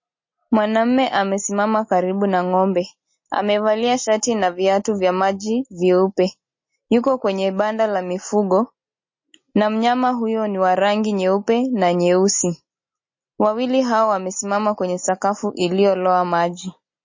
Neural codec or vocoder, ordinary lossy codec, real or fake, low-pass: none; MP3, 32 kbps; real; 7.2 kHz